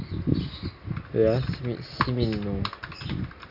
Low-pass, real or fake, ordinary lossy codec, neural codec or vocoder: 5.4 kHz; real; none; none